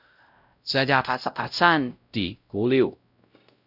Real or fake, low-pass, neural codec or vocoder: fake; 5.4 kHz; codec, 16 kHz, 0.5 kbps, X-Codec, WavLM features, trained on Multilingual LibriSpeech